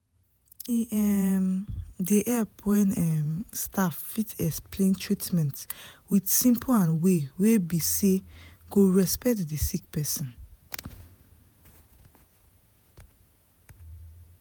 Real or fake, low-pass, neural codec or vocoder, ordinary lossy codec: fake; none; vocoder, 48 kHz, 128 mel bands, Vocos; none